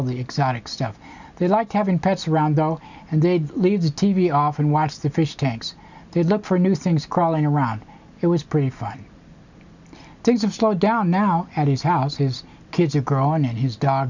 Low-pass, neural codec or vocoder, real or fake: 7.2 kHz; none; real